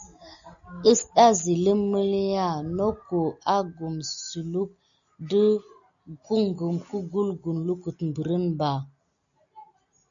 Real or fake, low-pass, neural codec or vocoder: real; 7.2 kHz; none